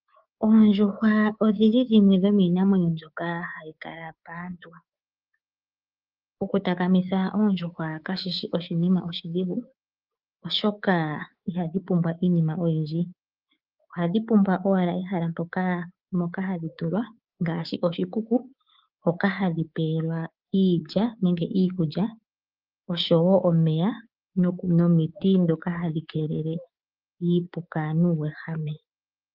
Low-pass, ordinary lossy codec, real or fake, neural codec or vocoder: 5.4 kHz; Opus, 32 kbps; fake; codec, 24 kHz, 3.1 kbps, DualCodec